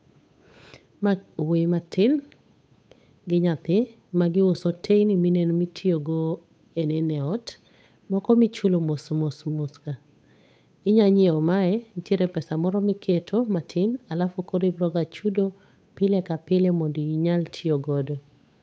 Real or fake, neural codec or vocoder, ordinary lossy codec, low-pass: fake; codec, 16 kHz, 8 kbps, FunCodec, trained on Chinese and English, 25 frames a second; none; none